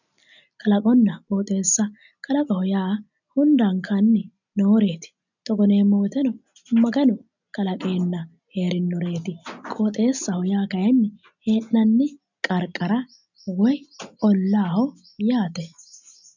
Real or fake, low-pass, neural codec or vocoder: real; 7.2 kHz; none